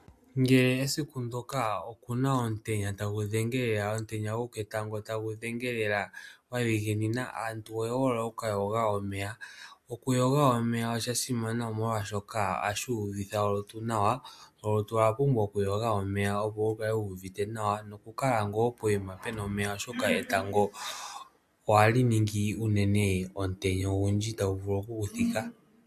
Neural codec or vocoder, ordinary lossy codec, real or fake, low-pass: none; AAC, 96 kbps; real; 14.4 kHz